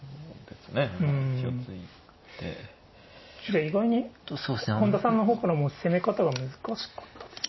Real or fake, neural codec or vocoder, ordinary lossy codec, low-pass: real; none; MP3, 24 kbps; 7.2 kHz